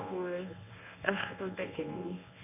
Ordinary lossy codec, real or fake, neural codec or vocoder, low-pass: none; fake; codec, 24 kHz, 0.9 kbps, WavTokenizer, medium speech release version 1; 3.6 kHz